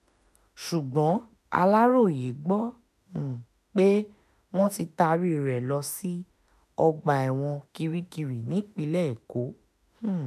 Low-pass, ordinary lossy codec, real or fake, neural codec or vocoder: 14.4 kHz; none; fake; autoencoder, 48 kHz, 32 numbers a frame, DAC-VAE, trained on Japanese speech